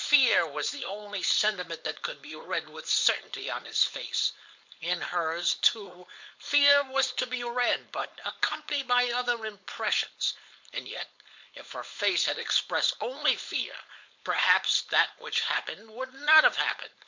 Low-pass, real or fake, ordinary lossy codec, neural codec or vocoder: 7.2 kHz; fake; MP3, 64 kbps; codec, 16 kHz, 4.8 kbps, FACodec